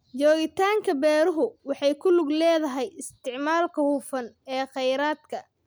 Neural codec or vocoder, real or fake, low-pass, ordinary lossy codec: none; real; none; none